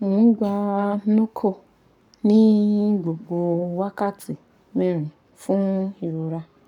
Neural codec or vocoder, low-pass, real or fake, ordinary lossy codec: codec, 44.1 kHz, 7.8 kbps, Pupu-Codec; 19.8 kHz; fake; none